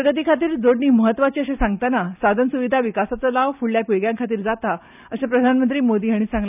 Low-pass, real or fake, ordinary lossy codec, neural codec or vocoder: 3.6 kHz; real; none; none